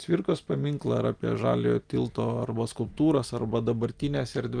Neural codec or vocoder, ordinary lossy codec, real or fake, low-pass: none; Opus, 32 kbps; real; 9.9 kHz